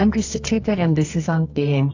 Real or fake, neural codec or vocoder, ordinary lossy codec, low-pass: fake; codec, 24 kHz, 0.9 kbps, WavTokenizer, medium music audio release; AAC, 48 kbps; 7.2 kHz